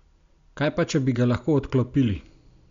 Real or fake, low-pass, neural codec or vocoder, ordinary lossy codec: real; 7.2 kHz; none; MP3, 64 kbps